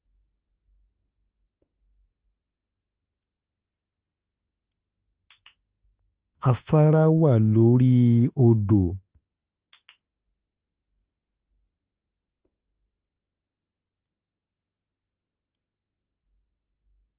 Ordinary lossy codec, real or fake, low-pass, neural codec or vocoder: Opus, 32 kbps; fake; 3.6 kHz; autoencoder, 48 kHz, 32 numbers a frame, DAC-VAE, trained on Japanese speech